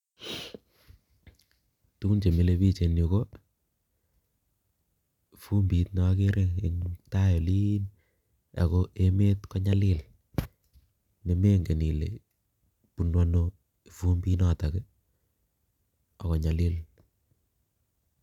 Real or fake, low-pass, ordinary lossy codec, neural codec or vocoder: real; 19.8 kHz; none; none